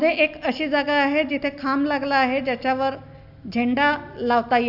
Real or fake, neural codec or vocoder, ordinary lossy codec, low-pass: real; none; AAC, 48 kbps; 5.4 kHz